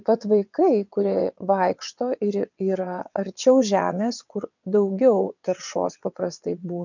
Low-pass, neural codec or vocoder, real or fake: 7.2 kHz; vocoder, 22.05 kHz, 80 mel bands, WaveNeXt; fake